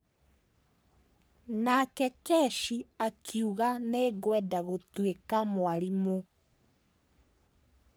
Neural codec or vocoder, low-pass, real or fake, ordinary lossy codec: codec, 44.1 kHz, 3.4 kbps, Pupu-Codec; none; fake; none